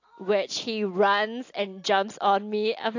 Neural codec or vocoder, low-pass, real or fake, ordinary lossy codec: none; 7.2 kHz; real; AAC, 48 kbps